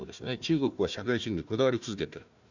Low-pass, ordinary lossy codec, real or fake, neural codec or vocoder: 7.2 kHz; none; fake; codec, 16 kHz, 1 kbps, FunCodec, trained on Chinese and English, 50 frames a second